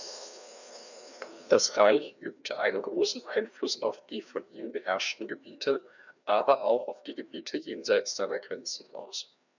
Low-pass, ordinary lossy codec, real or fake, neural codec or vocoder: 7.2 kHz; none; fake; codec, 16 kHz, 1 kbps, FreqCodec, larger model